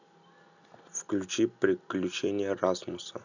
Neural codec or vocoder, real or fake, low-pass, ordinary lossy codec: none; real; 7.2 kHz; none